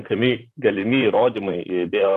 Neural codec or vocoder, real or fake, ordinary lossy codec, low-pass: vocoder, 44.1 kHz, 128 mel bands, Pupu-Vocoder; fake; AAC, 64 kbps; 14.4 kHz